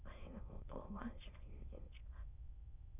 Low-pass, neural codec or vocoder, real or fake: 3.6 kHz; autoencoder, 22.05 kHz, a latent of 192 numbers a frame, VITS, trained on many speakers; fake